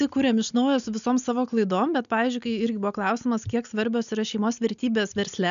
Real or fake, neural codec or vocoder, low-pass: real; none; 7.2 kHz